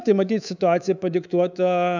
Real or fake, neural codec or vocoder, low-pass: fake; codec, 24 kHz, 3.1 kbps, DualCodec; 7.2 kHz